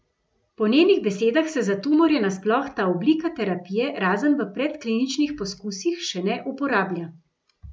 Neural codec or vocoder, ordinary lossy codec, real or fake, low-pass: none; none; real; none